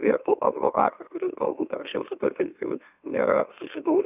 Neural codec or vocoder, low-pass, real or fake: autoencoder, 44.1 kHz, a latent of 192 numbers a frame, MeloTTS; 3.6 kHz; fake